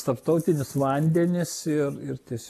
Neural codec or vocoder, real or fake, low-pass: none; real; 14.4 kHz